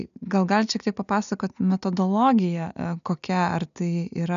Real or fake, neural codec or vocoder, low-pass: real; none; 7.2 kHz